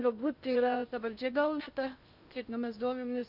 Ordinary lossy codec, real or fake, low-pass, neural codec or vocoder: Opus, 64 kbps; fake; 5.4 kHz; codec, 16 kHz in and 24 kHz out, 0.6 kbps, FocalCodec, streaming, 4096 codes